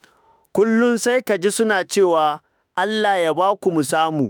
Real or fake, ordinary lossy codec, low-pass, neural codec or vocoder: fake; none; none; autoencoder, 48 kHz, 32 numbers a frame, DAC-VAE, trained on Japanese speech